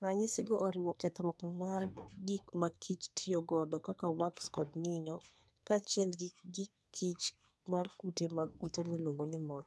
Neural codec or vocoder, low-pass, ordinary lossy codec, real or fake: codec, 24 kHz, 1 kbps, SNAC; none; none; fake